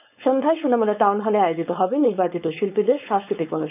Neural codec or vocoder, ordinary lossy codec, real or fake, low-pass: codec, 16 kHz, 4.8 kbps, FACodec; none; fake; 3.6 kHz